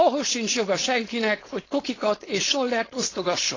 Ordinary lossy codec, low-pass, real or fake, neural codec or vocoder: AAC, 32 kbps; 7.2 kHz; fake; codec, 16 kHz, 4.8 kbps, FACodec